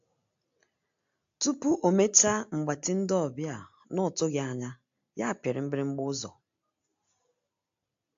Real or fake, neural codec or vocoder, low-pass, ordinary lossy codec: real; none; 7.2 kHz; AAC, 64 kbps